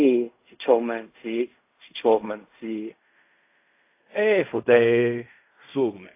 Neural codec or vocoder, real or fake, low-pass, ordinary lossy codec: codec, 16 kHz in and 24 kHz out, 0.4 kbps, LongCat-Audio-Codec, fine tuned four codebook decoder; fake; 3.6 kHz; AAC, 24 kbps